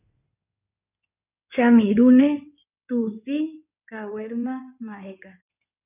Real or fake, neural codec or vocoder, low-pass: fake; codec, 16 kHz in and 24 kHz out, 2.2 kbps, FireRedTTS-2 codec; 3.6 kHz